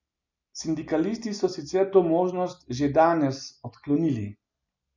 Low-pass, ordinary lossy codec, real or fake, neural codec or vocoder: 7.2 kHz; none; real; none